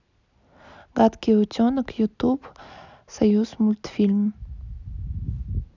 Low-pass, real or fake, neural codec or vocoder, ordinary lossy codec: 7.2 kHz; real; none; none